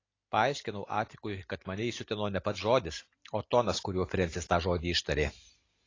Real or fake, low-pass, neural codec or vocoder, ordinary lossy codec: real; 7.2 kHz; none; AAC, 32 kbps